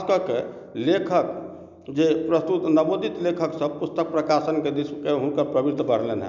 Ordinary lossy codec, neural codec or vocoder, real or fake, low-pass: none; none; real; 7.2 kHz